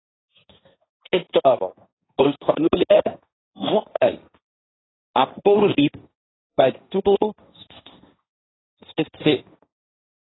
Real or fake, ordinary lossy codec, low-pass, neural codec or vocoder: fake; AAC, 16 kbps; 7.2 kHz; codec, 16 kHz, 1.1 kbps, Voila-Tokenizer